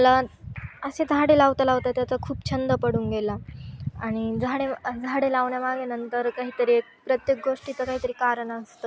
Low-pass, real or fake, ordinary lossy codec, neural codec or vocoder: none; real; none; none